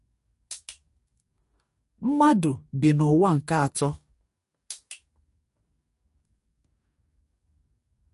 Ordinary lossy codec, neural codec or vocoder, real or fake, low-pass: MP3, 48 kbps; codec, 44.1 kHz, 2.6 kbps, DAC; fake; 14.4 kHz